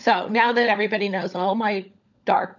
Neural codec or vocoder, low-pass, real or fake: codec, 16 kHz, 16 kbps, FunCodec, trained on LibriTTS, 50 frames a second; 7.2 kHz; fake